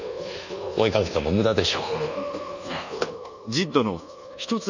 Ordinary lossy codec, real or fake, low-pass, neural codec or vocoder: none; fake; 7.2 kHz; codec, 24 kHz, 1.2 kbps, DualCodec